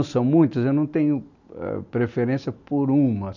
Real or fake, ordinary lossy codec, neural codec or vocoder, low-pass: real; none; none; 7.2 kHz